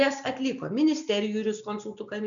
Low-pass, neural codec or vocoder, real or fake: 7.2 kHz; none; real